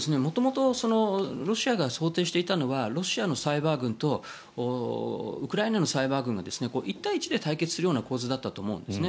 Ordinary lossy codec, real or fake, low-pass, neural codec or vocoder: none; real; none; none